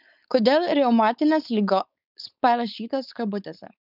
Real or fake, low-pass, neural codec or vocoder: fake; 5.4 kHz; codec, 16 kHz, 4.8 kbps, FACodec